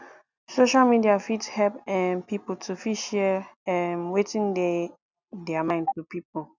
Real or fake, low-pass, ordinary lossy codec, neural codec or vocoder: real; 7.2 kHz; none; none